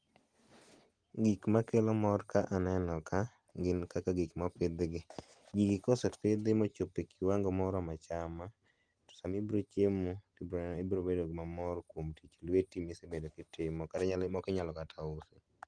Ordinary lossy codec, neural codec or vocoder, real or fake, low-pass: Opus, 24 kbps; none; real; 9.9 kHz